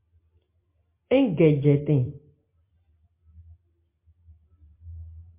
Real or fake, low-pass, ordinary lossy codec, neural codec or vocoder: real; 3.6 kHz; MP3, 24 kbps; none